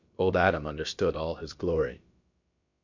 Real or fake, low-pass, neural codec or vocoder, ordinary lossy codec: fake; 7.2 kHz; codec, 16 kHz, about 1 kbps, DyCAST, with the encoder's durations; MP3, 48 kbps